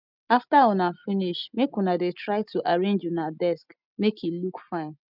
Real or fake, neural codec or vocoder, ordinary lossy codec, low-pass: real; none; none; 5.4 kHz